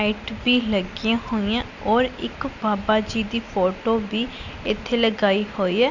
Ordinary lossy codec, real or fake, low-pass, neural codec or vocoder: none; real; 7.2 kHz; none